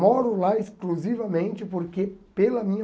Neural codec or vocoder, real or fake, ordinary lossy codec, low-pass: none; real; none; none